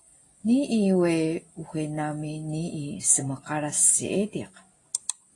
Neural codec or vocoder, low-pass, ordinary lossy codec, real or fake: none; 10.8 kHz; AAC, 32 kbps; real